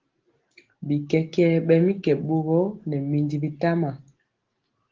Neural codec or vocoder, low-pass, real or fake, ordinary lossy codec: none; 7.2 kHz; real; Opus, 16 kbps